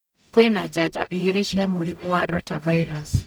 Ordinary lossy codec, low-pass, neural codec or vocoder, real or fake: none; none; codec, 44.1 kHz, 0.9 kbps, DAC; fake